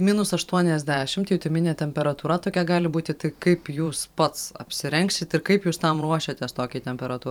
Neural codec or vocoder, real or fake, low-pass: vocoder, 44.1 kHz, 128 mel bands every 512 samples, BigVGAN v2; fake; 19.8 kHz